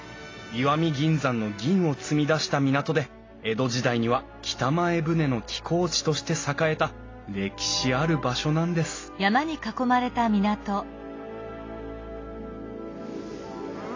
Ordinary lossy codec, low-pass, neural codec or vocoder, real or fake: AAC, 32 kbps; 7.2 kHz; none; real